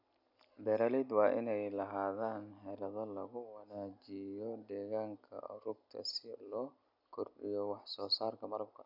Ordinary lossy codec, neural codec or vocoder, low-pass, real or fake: none; none; 5.4 kHz; real